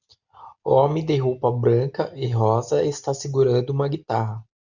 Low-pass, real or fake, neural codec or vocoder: 7.2 kHz; real; none